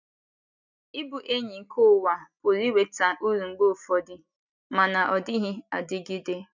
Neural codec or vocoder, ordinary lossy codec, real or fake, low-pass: none; none; real; 7.2 kHz